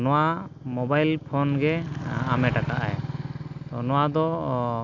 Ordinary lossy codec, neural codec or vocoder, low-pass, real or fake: none; none; 7.2 kHz; real